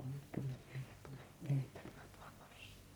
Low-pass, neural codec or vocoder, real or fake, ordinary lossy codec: none; codec, 44.1 kHz, 1.7 kbps, Pupu-Codec; fake; none